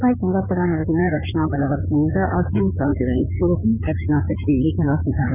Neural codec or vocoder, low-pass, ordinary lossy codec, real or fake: codec, 16 kHz in and 24 kHz out, 2.2 kbps, FireRedTTS-2 codec; 3.6 kHz; none; fake